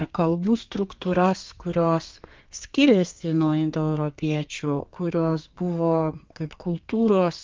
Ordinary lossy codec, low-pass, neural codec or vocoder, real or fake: Opus, 32 kbps; 7.2 kHz; codec, 32 kHz, 1.9 kbps, SNAC; fake